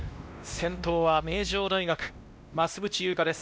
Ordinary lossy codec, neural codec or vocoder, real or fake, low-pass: none; codec, 16 kHz, 1 kbps, X-Codec, WavLM features, trained on Multilingual LibriSpeech; fake; none